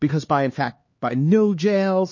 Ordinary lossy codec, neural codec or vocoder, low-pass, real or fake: MP3, 32 kbps; codec, 16 kHz, 2 kbps, X-Codec, HuBERT features, trained on LibriSpeech; 7.2 kHz; fake